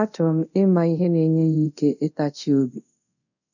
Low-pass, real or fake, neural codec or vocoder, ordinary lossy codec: 7.2 kHz; fake; codec, 24 kHz, 0.5 kbps, DualCodec; none